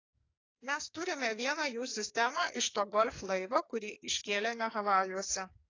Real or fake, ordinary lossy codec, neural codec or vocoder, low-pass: fake; AAC, 32 kbps; codec, 44.1 kHz, 2.6 kbps, SNAC; 7.2 kHz